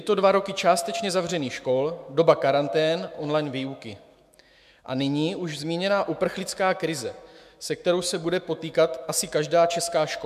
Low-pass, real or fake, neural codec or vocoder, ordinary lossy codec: 14.4 kHz; fake; autoencoder, 48 kHz, 128 numbers a frame, DAC-VAE, trained on Japanese speech; MP3, 96 kbps